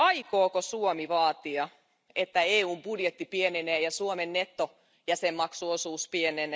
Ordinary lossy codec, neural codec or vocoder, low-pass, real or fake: none; none; none; real